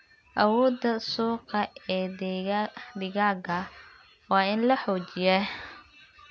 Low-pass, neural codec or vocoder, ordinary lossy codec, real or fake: none; none; none; real